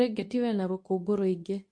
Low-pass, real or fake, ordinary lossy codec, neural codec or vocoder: 9.9 kHz; fake; MP3, 48 kbps; codec, 24 kHz, 0.9 kbps, WavTokenizer, medium speech release version 2